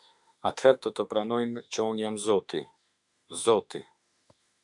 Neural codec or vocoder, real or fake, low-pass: autoencoder, 48 kHz, 32 numbers a frame, DAC-VAE, trained on Japanese speech; fake; 10.8 kHz